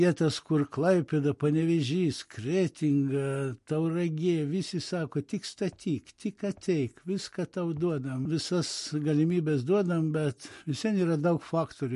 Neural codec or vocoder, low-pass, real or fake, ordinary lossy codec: none; 14.4 kHz; real; MP3, 48 kbps